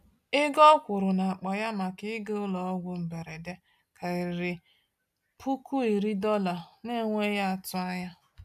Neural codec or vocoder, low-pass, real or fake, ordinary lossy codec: none; 14.4 kHz; real; none